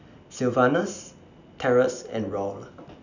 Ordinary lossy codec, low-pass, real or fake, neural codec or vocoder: none; 7.2 kHz; real; none